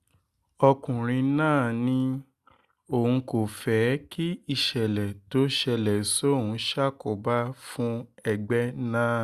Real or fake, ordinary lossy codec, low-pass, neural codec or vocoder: real; Opus, 64 kbps; 14.4 kHz; none